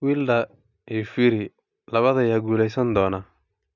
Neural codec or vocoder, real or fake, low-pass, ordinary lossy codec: none; real; 7.2 kHz; none